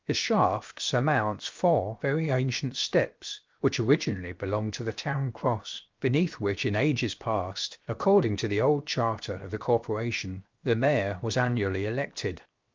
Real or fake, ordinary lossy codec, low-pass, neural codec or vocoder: fake; Opus, 24 kbps; 7.2 kHz; codec, 16 kHz, 0.7 kbps, FocalCodec